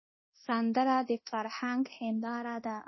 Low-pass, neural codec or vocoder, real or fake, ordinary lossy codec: 7.2 kHz; codec, 24 kHz, 0.9 kbps, DualCodec; fake; MP3, 24 kbps